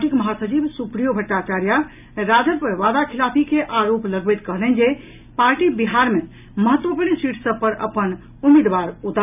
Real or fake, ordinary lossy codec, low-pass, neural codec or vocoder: real; none; 3.6 kHz; none